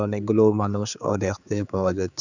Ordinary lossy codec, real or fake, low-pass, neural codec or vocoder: none; fake; 7.2 kHz; codec, 16 kHz, 4 kbps, X-Codec, HuBERT features, trained on general audio